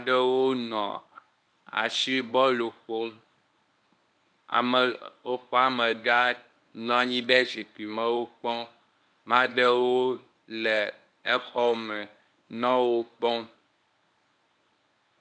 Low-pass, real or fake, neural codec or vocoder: 9.9 kHz; fake; codec, 24 kHz, 0.9 kbps, WavTokenizer, medium speech release version 1